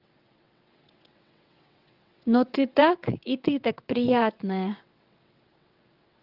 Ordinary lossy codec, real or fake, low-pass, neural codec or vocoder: Opus, 32 kbps; fake; 5.4 kHz; vocoder, 22.05 kHz, 80 mel bands, WaveNeXt